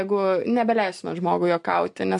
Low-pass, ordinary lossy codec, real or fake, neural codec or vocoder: 10.8 kHz; MP3, 64 kbps; real; none